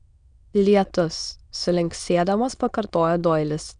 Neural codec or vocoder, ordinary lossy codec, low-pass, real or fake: autoencoder, 22.05 kHz, a latent of 192 numbers a frame, VITS, trained on many speakers; Opus, 64 kbps; 9.9 kHz; fake